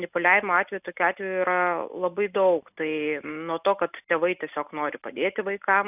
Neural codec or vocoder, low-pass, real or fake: none; 3.6 kHz; real